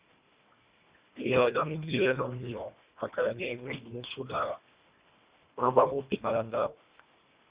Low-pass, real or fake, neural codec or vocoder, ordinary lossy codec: 3.6 kHz; fake; codec, 24 kHz, 1.5 kbps, HILCodec; Opus, 64 kbps